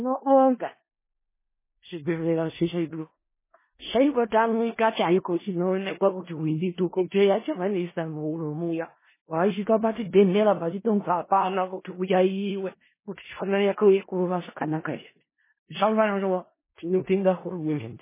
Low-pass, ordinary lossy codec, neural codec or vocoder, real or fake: 3.6 kHz; MP3, 16 kbps; codec, 16 kHz in and 24 kHz out, 0.4 kbps, LongCat-Audio-Codec, four codebook decoder; fake